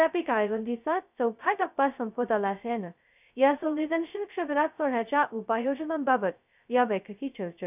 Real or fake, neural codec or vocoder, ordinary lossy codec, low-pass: fake; codec, 16 kHz, 0.2 kbps, FocalCodec; none; 3.6 kHz